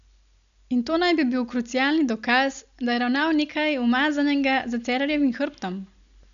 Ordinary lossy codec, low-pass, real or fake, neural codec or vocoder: AAC, 96 kbps; 7.2 kHz; real; none